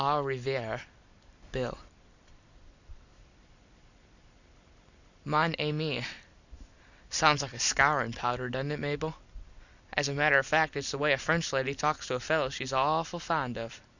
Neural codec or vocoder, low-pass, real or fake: vocoder, 44.1 kHz, 128 mel bands every 256 samples, BigVGAN v2; 7.2 kHz; fake